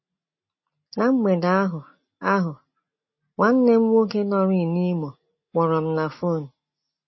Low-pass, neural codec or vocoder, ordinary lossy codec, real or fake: 7.2 kHz; none; MP3, 24 kbps; real